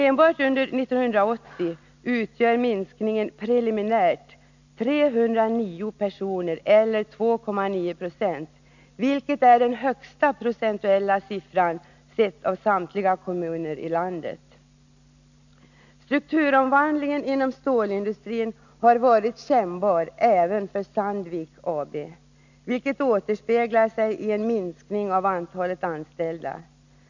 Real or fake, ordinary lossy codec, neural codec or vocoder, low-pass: real; none; none; 7.2 kHz